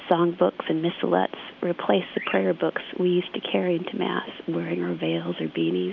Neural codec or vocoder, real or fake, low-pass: none; real; 7.2 kHz